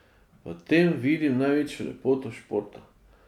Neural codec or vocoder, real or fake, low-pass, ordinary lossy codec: none; real; 19.8 kHz; none